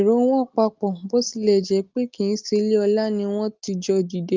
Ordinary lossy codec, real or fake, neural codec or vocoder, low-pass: Opus, 32 kbps; real; none; 7.2 kHz